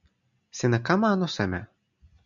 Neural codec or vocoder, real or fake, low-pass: none; real; 7.2 kHz